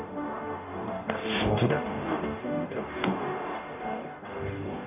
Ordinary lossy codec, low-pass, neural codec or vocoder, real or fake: none; 3.6 kHz; codec, 44.1 kHz, 0.9 kbps, DAC; fake